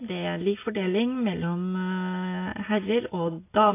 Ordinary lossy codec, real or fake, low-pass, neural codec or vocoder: AAC, 24 kbps; real; 3.6 kHz; none